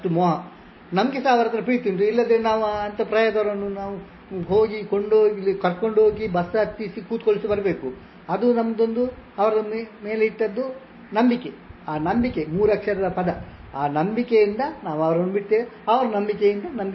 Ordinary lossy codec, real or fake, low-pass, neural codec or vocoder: MP3, 24 kbps; real; 7.2 kHz; none